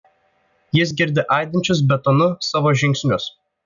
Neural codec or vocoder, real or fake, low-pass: none; real; 7.2 kHz